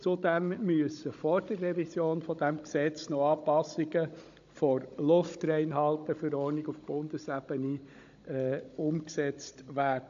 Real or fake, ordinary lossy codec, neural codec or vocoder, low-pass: fake; AAC, 64 kbps; codec, 16 kHz, 16 kbps, FunCodec, trained on Chinese and English, 50 frames a second; 7.2 kHz